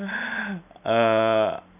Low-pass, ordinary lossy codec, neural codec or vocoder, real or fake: 3.6 kHz; none; none; real